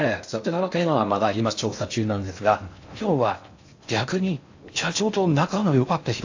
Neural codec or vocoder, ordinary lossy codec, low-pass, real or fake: codec, 16 kHz in and 24 kHz out, 0.6 kbps, FocalCodec, streaming, 4096 codes; AAC, 48 kbps; 7.2 kHz; fake